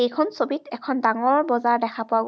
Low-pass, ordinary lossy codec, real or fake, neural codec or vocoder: none; none; real; none